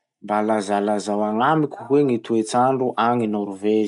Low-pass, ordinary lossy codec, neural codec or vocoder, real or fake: 10.8 kHz; none; none; real